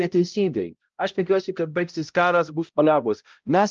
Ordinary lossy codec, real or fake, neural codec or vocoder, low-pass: Opus, 24 kbps; fake; codec, 16 kHz, 0.5 kbps, X-Codec, HuBERT features, trained on balanced general audio; 7.2 kHz